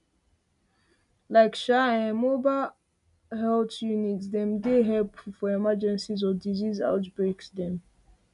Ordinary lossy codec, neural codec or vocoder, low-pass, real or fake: none; none; 10.8 kHz; real